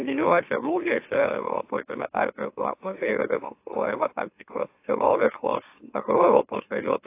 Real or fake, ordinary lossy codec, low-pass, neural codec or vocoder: fake; AAC, 24 kbps; 3.6 kHz; autoencoder, 44.1 kHz, a latent of 192 numbers a frame, MeloTTS